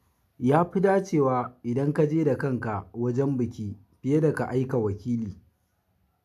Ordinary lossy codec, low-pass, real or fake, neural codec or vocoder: none; 14.4 kHz; real; none